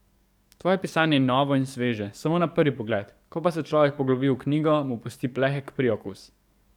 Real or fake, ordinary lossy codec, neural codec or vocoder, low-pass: fake; none; codec, 44.1 kHz, 7.8 kbps, DAC; 19.8 kHz